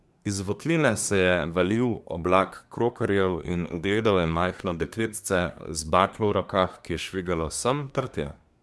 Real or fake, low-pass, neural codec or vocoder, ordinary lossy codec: fake; none; codec, 24 kHz, 1 kbps, SNAC; none